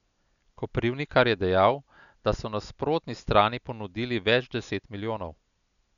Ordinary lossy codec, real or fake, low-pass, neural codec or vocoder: none; real; 7.2 kHz; none